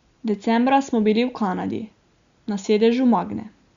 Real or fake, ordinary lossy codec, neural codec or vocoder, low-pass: real; Opus, 64 kbps; none; 7.2 kHz